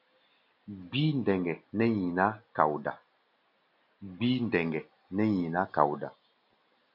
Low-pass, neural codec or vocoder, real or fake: 5.4 kHz; none; real